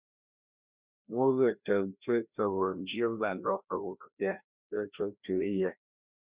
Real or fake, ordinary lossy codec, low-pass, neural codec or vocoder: fake; Opus, 64 kbps; 3.6 kHz; codec, 16 kHz, 1 kbps, FreqCodec, larger model